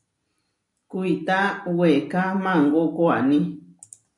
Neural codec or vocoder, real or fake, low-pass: none; real; 10.8 kHz